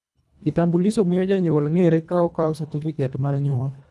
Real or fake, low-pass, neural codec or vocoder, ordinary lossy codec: fake; none; codec, 24 kHz, 1.5 kbps, HILCodec; none